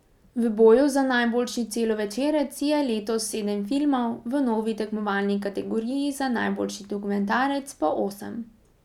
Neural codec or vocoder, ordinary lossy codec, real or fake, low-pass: none; none; real; 19.8 kHz